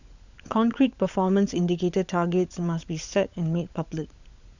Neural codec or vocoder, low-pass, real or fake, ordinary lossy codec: codec, 16 kHz, 16 kbps, FunCodec, trained on LibriTTS, 50 frames a second; 7.2 kHz; fake; none